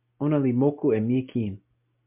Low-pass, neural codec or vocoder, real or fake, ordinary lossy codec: 3.6 kHz; none; real; MP3, 32 kbps